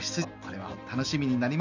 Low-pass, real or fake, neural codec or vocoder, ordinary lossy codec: 7.2 kHz; real; none; none